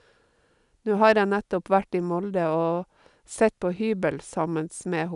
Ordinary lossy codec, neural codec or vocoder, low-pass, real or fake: none; none; 10.8 kHz; real